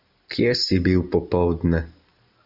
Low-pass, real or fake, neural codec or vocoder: 5.4 kHz; real; none